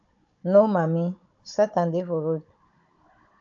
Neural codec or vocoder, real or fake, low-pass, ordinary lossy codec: codec, 16 kHz, 16 kbps, FunCodec, trained on Chinese and English, 50 frames a second; fake; 7.2 kHz; AAC, 64 kbps